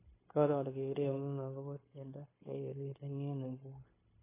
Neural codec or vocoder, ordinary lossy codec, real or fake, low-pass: codec, 16 kHz, 0.9 kbps, LongCat-Audio-Codec; AAC, 16 kbps; fake; 3.6 kHz